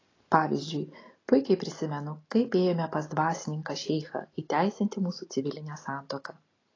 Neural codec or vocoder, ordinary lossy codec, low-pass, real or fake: none; AAC, 32 kbps; 7.2 kHz; real